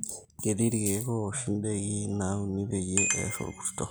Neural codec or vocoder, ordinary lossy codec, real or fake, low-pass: none; none; real; none